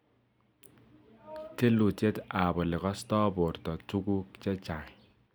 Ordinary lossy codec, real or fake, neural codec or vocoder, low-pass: none; real; none; none